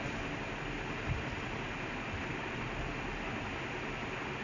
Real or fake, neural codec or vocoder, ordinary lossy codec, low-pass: real; none; none; 7.2 kHz